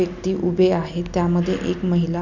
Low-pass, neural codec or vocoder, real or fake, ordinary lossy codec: 7.2 kHz; none; real; none